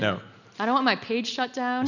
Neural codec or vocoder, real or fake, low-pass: none; real; 7.2 kHz